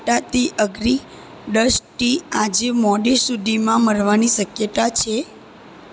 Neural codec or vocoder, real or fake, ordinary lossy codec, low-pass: none; real; none; none